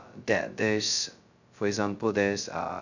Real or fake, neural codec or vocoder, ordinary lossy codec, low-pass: fake; codec, 16 kHz, 0.2 kbps, FocalCodec; none; 7.2 kHz